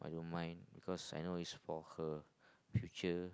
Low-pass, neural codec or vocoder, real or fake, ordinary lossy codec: none; none; real; none